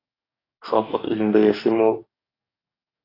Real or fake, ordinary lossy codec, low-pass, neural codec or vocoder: fake; AAC, 24 kbps; 5.4 kHz; codec, 44.1 kHz, 2.6 kbps, DAC